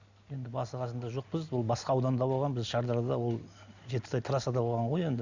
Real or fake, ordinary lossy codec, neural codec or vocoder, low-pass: real; Opus, 64 kbps; none; 7.2 kHz